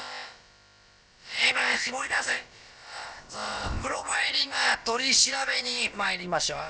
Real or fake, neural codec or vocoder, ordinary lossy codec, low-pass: fake; codec, 16 kHz, about 1 kbps, DyCAST, with the encoder's durations; none; none